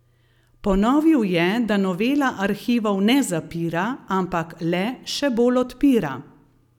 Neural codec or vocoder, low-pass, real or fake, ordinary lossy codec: none; 19.8 kHz; real; none